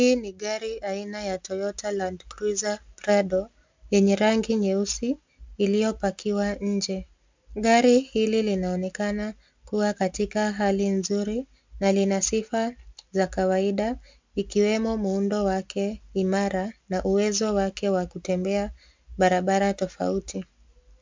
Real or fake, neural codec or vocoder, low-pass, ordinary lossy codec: real; none; 7.2 kHz; MP3, 64 kbps